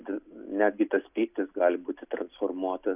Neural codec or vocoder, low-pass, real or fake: none; 3.6 kHz; real